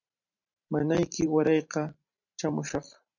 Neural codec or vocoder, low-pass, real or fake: vocoder, 44.1 kHz, 128 mel bands every 512 samples, BigVGAN v2; 7.2 kHz; fake